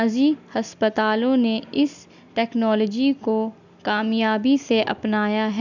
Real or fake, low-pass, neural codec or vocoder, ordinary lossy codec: real; 7.2 kHz; none; none